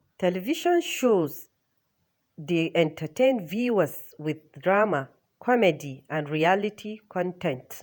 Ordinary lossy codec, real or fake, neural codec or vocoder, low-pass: none; fake; vocoder, 48 kHz, 128 mel bands, Vocos; none